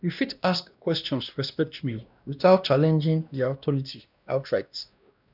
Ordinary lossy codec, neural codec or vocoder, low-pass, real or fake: none; codec, 16 kHz, 1 kbps, X-Codec, WavLM features, trained on Multilingual LibriSpeech; 5.4 kHz; fake